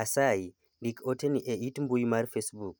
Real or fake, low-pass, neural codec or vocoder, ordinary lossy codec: real; none; none; none